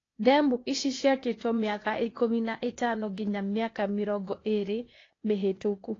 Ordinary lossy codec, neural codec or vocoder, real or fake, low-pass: AAC, 32 kbps; codec, 16 kHz, 0.8 kbps, ZipCodec; fake; 7.2 kHz